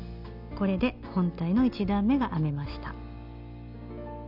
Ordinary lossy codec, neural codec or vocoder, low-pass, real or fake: none; none; 5.4 kHz; real